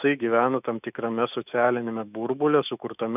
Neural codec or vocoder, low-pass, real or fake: autoencoder, 48 kHz, 128 numbers a frame, DAC-VAE, trained on Japanese speech; 3.6 kHz; fake